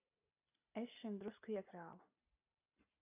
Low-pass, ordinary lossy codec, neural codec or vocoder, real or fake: 3.6 kHz; AAC, 32 kbps; none; real